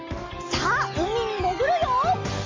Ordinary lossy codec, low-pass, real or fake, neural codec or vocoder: Opus, 32 kbps; 7.2 kHz; real; none